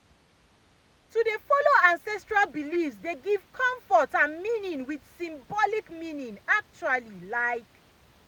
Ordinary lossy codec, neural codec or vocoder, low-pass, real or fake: none; none; none; real